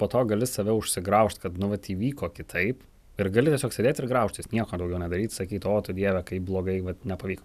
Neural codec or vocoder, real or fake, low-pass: none; real; 14.4 kHz